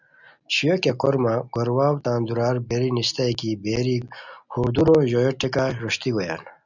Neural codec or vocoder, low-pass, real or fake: none; 7.2 kHz; real